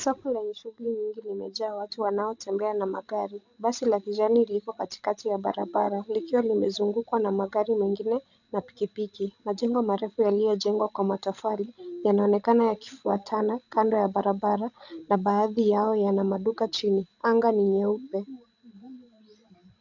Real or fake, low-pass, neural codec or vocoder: fake; 7.2 kHz; codec, 16 kHz, 16 kbps, FreqCodec, larger model